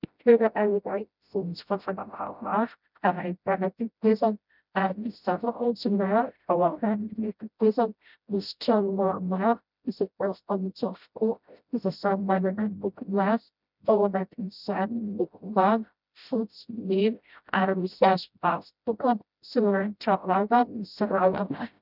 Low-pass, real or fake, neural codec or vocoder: 5.4 kHz; fake; codec, 16 kHz, 0.5 kbps, FreqCodec, smaller model